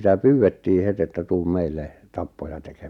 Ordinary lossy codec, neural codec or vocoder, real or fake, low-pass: none; none; real; 19.8 kHz